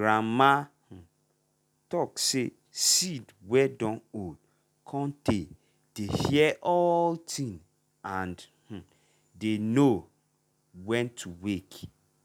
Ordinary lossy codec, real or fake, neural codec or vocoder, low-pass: none; real; none; 19.8 kHz